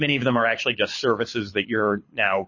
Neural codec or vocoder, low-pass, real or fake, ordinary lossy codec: codec, 24 kHz, 6 kbps, HILCodec; 7.2 kHz; fake; MP3, 32 kbps